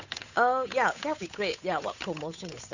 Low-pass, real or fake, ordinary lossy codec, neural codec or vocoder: 7.2 kHz; fake; none; codec, 16 kHz, 8 kbps, FunCodec, trained on Chinese and English, 25 frames a second